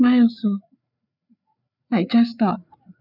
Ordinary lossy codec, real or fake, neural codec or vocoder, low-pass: none; fake; codec, 16 kHz, 4 kbps, FreqCodec, larger model; 5.4 kHz